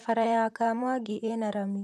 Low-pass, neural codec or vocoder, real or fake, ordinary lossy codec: 14.4 kHz; vocoder, 44.1 kHz, 128 mel bands, Pupu-Vocoder; fake; none